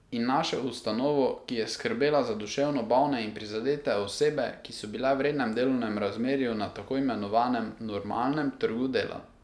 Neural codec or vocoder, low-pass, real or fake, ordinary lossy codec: none; none; real; none